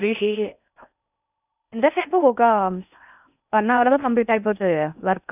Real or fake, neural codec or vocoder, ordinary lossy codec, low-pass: fake; codec, 16 kHz in and 24 kHz out, 0.6 kbps, FocalCodec, streaming, 4096 codes; none; 3.6 kHz